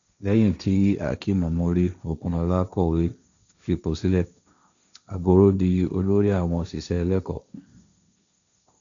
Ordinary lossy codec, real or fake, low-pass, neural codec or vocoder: none; fake; 7.2 kHz; codec, 16 kHz, 1.1 kbps, Voila-Tokenizer